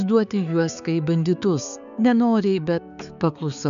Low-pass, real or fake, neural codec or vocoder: 7.2 kHz; fake; codec, 16 kHz, 4 kbps, X-Codec, HuBERT features, trained on balanced general audio